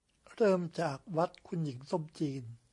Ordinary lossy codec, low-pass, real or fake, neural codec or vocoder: MP3, 48 kbps; 10.8 kHz; real; none